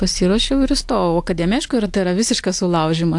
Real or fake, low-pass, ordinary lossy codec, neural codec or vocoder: real; 10.8 kHz; AAC, 64 kbps; none